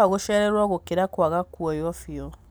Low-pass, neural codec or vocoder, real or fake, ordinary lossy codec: none; none; real; none